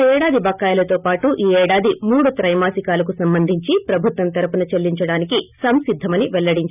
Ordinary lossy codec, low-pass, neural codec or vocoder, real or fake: none; 3.6 kHz; none; real